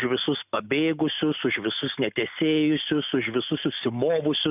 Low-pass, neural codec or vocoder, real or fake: 3.6 kHz; none; real